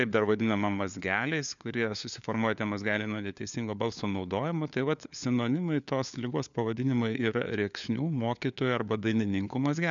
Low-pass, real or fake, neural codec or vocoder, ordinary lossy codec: 7.2 kHz; fake; codec, 16 kHz, 4 kbps, FunCodec, trained on LibriTTS, 50 frames a second; MP3, 96 kbps